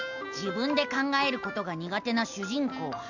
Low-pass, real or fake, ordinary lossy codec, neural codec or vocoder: 7.2 kHz; real; none; none